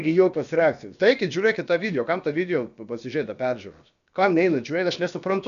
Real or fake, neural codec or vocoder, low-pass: fake; codec, 16 kHz, about 1 kbps, DyCAST, with the encoder's durations; 7.2 kHz